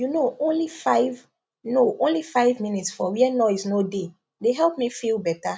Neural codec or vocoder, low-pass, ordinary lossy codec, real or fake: none; none; none; real